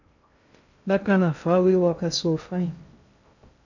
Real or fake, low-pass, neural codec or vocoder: fake; 7.2 kHz; codec, 16 kHz in and 24 kHz out, 0.6 kbps, FocalCodec, streaming, 2048 codes